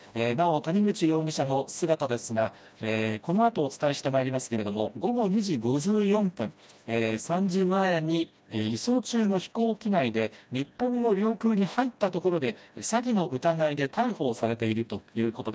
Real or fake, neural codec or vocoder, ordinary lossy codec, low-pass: fake; codec, 16 kHz, 1 kbps, FreqCodec, smaller model; none; none